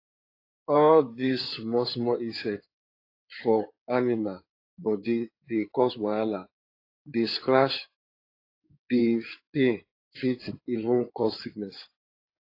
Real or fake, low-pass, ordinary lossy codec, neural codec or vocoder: fake; 5.4 kHz; AAC, 32 kbps; codec, 16 kHz in and 24 kHz out, 2.2 kbps, FireRedTTS-2 codec